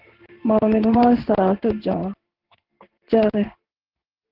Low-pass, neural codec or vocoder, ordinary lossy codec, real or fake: 5.4 kHz; codec, 16 kHz in and 24 kHz out, 1 kbps, XY-Tokenizer; Opus, 16 kbps; fake